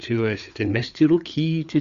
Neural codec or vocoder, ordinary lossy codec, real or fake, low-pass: codec, 16 kHz, 4 kbps, FreqCodec, larger model; Opus, 64 kbps; fake; 7.2 kHz